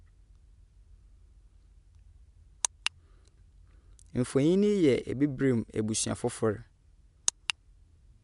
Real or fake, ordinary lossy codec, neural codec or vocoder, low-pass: real; none; none; 10.8 kHz